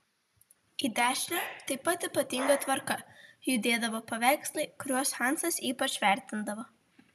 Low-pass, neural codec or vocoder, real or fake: 14.4 kHz; none; real